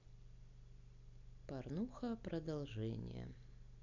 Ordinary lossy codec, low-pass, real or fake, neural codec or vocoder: none; 7.2 kHz; real; none